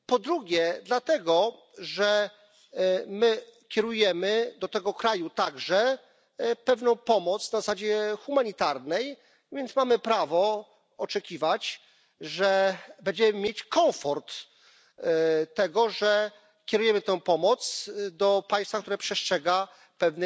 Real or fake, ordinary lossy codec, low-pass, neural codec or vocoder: real; none; none; none